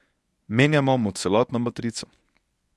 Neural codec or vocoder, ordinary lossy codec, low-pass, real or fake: codec, 24 kHz, 0.9 kbps, WavTokenizer, medium speech release version 1; none; none; fake